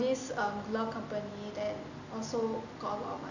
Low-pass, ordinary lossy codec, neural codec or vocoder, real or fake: 7.2 kHz; none; none; real